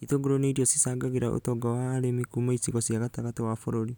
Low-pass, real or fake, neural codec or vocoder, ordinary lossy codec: none; real; none; none